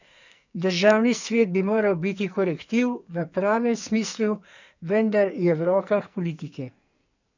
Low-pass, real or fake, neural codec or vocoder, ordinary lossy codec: 7.2 kHz; fake; codec, 44.1 kHz, 2.6 kbps, SNAC; none